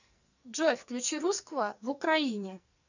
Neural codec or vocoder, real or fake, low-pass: codec, 44.1 kHz, 2.6 kbps, SNAC; fake; 7.2 kHz